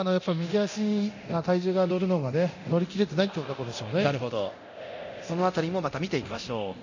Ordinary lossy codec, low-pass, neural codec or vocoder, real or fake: none; 7.2 kHz; codec, 24 kHz, 0.9 kbps, DualCodec; fake